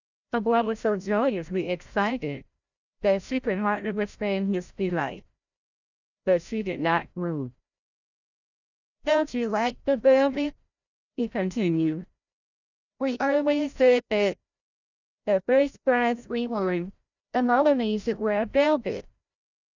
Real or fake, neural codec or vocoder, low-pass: fake; codec, 16 kHz, 0.5 kbps, FreqCodec, larger model; 7.2 kHz